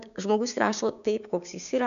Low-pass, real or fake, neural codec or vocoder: 7.2 kHz; fake; codec, 16 kHz, 6 kbps, DAC